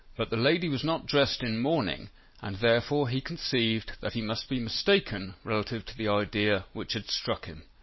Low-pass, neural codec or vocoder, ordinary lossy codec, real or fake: 7.2 kHz; none; MP3, 24 kbps; real